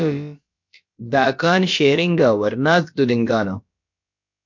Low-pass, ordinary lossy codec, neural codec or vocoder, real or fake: 7.2 kHz; MP3, 48 kbps; codec, 16 kHz, about 1 kbps, DyCAST, with the encoder's durations; fake